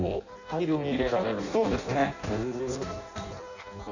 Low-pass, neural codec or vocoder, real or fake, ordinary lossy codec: 7.2 kHz; codec, 16 kHz in and 24 kHz out, 0.6 kbps, FireRedTTS-2 codec; fake; none